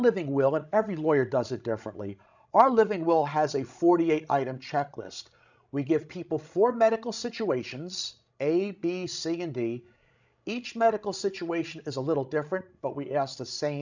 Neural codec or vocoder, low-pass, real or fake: codec, 16 kHz, 16 kbps, FreqCodec, larger model; 7.2 kHz; fake